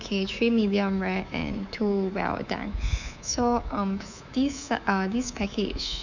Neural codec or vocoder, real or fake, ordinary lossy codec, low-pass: codec, 24 kHz, 3.1 kbps, DualCodec; fake; none; 7.2 kHz